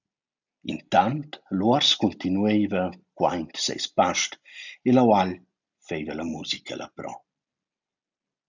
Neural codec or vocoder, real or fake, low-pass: none; real; 7.2 kHz